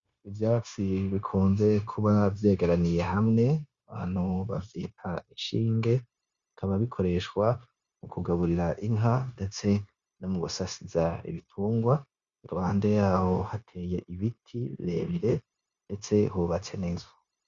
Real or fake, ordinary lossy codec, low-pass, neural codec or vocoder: fake; Opus, 64 kbps; 7.2 kHz; codec, 16 kHz, 0.9 kbps, LongCat-Audio-Codec